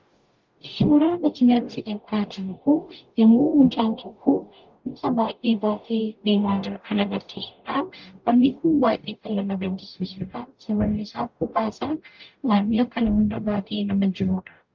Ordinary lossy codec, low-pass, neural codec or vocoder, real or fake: Opus, 24 kbps; 7.2 kHz; codec, 44.1 kHz, 0.9 kbps, DAC; fake